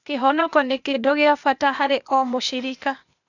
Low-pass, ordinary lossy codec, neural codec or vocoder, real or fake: 7.2 kHz; none; codec, 16 kHz, 0.8 kbps, ZipCodec; fake